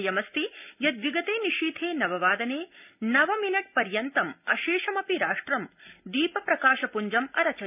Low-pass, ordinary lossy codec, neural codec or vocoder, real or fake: 3.6 kHz; none; none; real